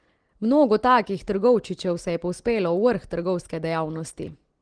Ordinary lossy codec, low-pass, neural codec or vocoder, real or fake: Opus, 16 kbps; 9.9 kHz; none; real